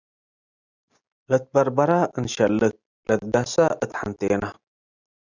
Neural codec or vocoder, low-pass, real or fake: none; 7.2 kHz; real